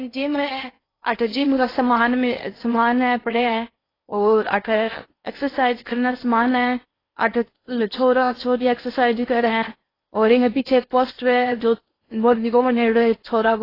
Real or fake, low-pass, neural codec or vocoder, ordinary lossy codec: fake; 5.4 kHz; codec, 16 kHz in and 24 kHz out, 0.6 kbps, FocalCodec, streaming, 4096 codes; AAC, 24 kbps